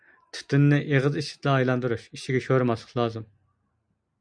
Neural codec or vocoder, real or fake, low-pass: none; real; 9.9 kHz